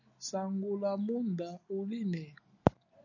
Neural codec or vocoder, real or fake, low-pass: none; real; 7.2 kHz